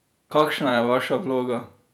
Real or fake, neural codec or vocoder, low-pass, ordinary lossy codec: fake; vocoder, 48 kHz, 128 mel bands, Vocos; 19.8 kHz; none